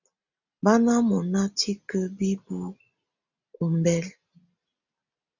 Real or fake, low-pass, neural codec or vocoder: real; 7.2 kHz; none